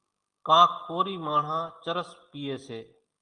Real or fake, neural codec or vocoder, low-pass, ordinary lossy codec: real; none; 9.9 kHz; Opus, 24 kbps